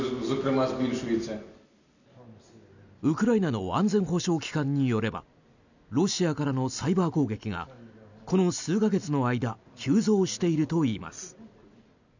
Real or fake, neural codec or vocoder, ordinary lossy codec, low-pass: real; none; none; 7.2 kHz